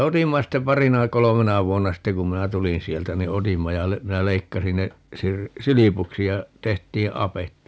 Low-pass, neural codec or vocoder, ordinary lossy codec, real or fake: none; none; none; real